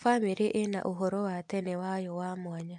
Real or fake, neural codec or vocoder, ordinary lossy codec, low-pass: real; none; MP3, 64 kbps; 10.8 kHz